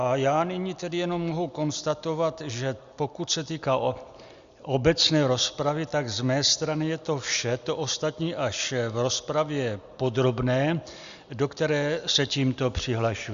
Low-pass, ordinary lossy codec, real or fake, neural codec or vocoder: 7.2 kHz; Opus, 64 kbps; real; none